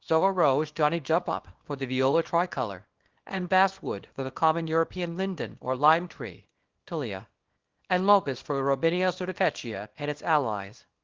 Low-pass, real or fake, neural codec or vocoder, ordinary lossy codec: 7.2 kHz; fake; codec, 24 kHz, 0.9 kbps, WavTokenizer, small release; Opus, 16 kbps